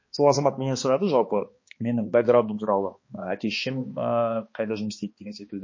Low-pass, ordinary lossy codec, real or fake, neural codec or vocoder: 7.2 kHz; MP3, 32 kbps; fake; codec, 16 kHz, 2 kbps, X-Codec, HuBERT features, trained on balanced general audio